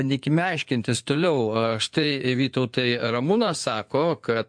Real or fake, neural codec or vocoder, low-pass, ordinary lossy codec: fake; codec, 16 kHz in and 24 kHz out, 2.2 kbps, FireRedTTS-2 codec; 9.9 kHz; MP3, 64 kbps